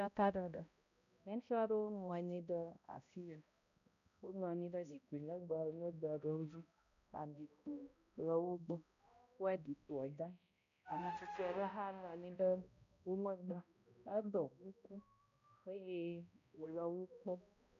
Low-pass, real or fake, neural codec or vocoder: 7.2 kHz; fake; codec, 16 kHz, 0.5 kbps, X-Codec, HuBERT features, trained on balanced general audio